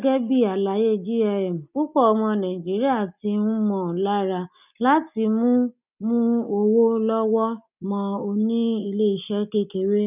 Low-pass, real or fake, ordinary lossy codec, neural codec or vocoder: 3.6 kHz; real; none; none